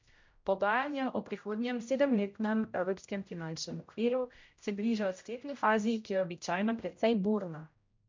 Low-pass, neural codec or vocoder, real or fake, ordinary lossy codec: 7.2 kHz; codec, 16 kHz, 0.5 kbps, X-Codec, HuBERT features, trained on general audio; fake; MP3, 48 kbps